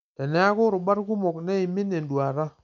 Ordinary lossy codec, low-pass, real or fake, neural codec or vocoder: MP3, 64 kbps; 7.2 kHz; real; none